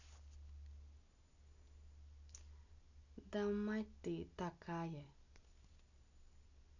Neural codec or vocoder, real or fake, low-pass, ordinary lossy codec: none; real; 7.2 kHz; none